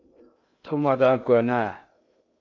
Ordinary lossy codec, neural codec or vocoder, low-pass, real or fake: AAC, 48 kbps; codec, 16 kHz in and 24 kHz out, 0.6 kbps, FocalCodec, streaming, 2048 codes; 7.2 kHz; fake